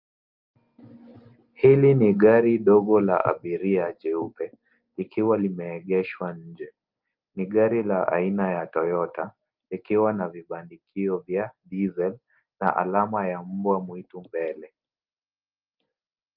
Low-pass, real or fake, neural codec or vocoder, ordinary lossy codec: 5.4 kHz; real; none; Opus, 32 kbps